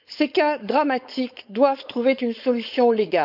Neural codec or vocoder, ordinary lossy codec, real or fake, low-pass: codec, 16 kHz, 4.8 kbps, FACodec; none; fake; 5.4 kHz